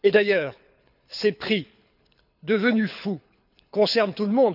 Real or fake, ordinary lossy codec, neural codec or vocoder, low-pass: fake; none; codec, 24 kHz, 6 kbps, HILCodec; 5.4 kHz